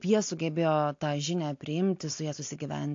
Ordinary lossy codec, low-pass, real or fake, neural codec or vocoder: AAC, 48 kbps; 7.2 kHz; real; none